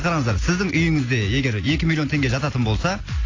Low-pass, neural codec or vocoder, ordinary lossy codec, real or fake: 7.2 kHz; none; AAC, 32 kbps; real